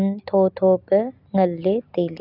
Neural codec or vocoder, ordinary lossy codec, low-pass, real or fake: none; none; 5.4 kHz; real